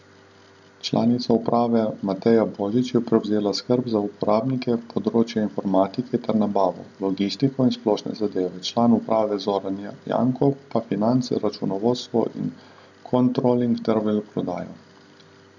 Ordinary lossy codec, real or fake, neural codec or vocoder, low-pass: none; real; none; 7.2 kHz